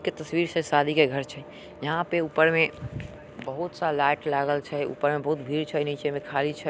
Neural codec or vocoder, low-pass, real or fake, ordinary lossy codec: none; none; real; none